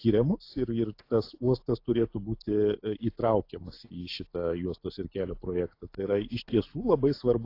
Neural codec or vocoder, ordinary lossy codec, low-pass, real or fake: none; AAC, 32 kbps; 5.4 kHz; real